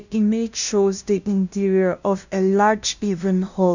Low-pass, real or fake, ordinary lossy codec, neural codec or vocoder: 7.2 kHz; fake; none; codec, 16 kHz, 0.5 kbps, FunCodec, trained on LibriTTS, 25 frames a second